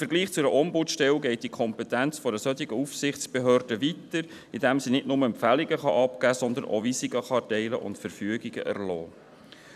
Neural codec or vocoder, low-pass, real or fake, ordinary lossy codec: none; 14.4 kHz; real; none